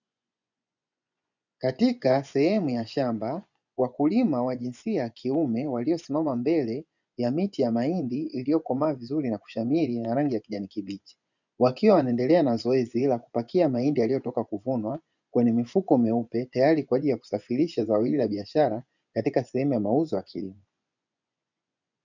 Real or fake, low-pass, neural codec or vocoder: fake; 7.2 kHz; vocoder, 24 kHz, 100 mel bands, Vocos